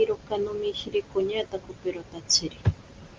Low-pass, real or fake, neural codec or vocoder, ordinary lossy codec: 7.2 kHz; real; none; Opus, 24 kbps